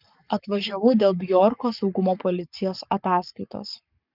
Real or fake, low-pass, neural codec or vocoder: fake; 5.4 kHz; codec, 44.1 kHz, 7.8 kbps, Pupu-Codec